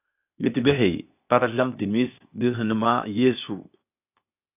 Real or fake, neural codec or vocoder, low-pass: fake; codec, 16 kHz, 0.8 kbps, ZipCodec; 3.6 kHz